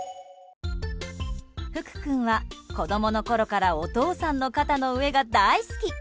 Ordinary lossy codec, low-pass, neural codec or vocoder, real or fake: none; none; none; real